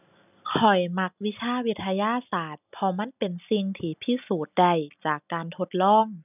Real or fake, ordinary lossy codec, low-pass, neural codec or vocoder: real; none; 3.6 kHz; none